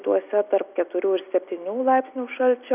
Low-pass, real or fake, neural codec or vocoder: 3.6 kHz; real; none